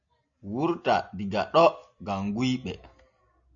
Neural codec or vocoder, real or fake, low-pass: none; real; 7.2 kHz